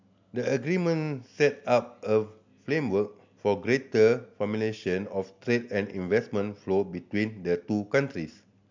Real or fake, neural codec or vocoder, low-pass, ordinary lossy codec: real; none; 7.2 kHz; MP3, 64 kbps